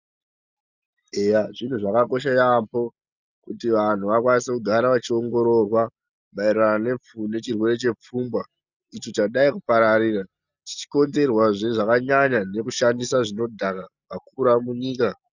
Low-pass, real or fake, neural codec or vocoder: 7.2 kHz; real; none